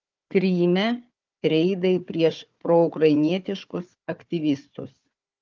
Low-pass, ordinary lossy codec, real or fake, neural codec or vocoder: 7.2 kHz; Opus, 32 kbps; fake; codec, 16 kHz, 4 kbps, FunCodec, trained on Chinese and English, 50 frames a second